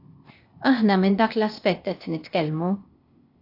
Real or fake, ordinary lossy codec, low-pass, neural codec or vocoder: fake; AAC, 32 kbps; 5.4 kHz; codec, 16 kHz, 0.8 kbps, ZipCodec